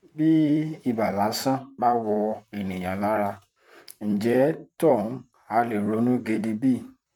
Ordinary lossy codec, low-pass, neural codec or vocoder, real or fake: MP3, 96 kbps; 19.8 kHz; vocoder, 44.1 kHz, 128 mel bands, Pupu-Vocoder; fake